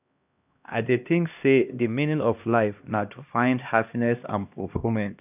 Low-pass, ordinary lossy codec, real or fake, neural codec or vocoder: 3.6 kHz; none; fake; codec, 16 kHz, 1 kbps, X-Codec, HuBERT features, trained on LibriSpeech